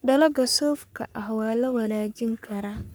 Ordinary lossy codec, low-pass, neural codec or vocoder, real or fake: none; none; codec, 44.1 kHz, 3.4 kbps, Pupu-Codec; fake